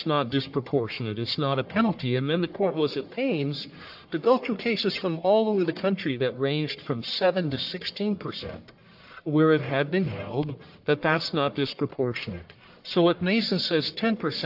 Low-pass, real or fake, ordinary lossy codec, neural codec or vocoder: 5.4 kHz; fake; AAC, 48 kbps; codec, 44.1 kHz, 1.7 kbps, Pupu-Codec